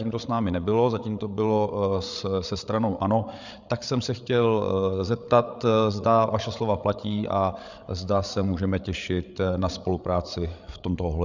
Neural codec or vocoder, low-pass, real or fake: codec, 16 kHz, 8 kbps, FreqCodec, larger model; 7.2 kHz; fake